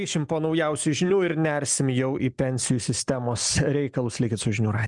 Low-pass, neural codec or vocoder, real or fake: 10.8 kHz; none; real